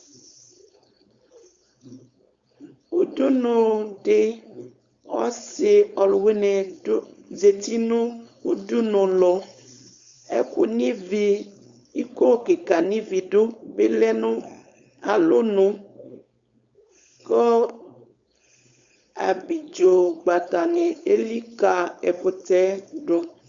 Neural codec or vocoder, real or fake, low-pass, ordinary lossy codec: codec, 16 kHz, 4.8 kbps, FACodec; fake; 7.2 kHz; Opus, 64 kbps